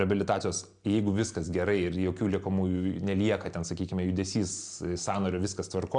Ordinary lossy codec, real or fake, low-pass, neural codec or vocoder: Opus, 64 kbps; real; 9.9 kHz; none